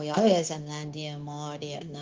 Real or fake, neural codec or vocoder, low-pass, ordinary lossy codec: fake; codec, 16 kHz, 0.9 kbps, LongCat-Audio-Codec; 7.2 kHz; Opus, 24 kbps